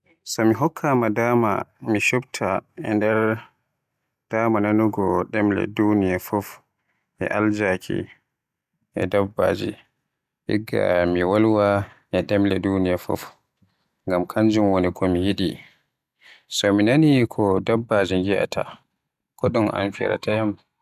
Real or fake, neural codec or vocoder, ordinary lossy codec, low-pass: real; none; none; 14.4 kHz